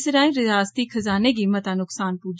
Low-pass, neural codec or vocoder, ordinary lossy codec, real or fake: none; none; none; real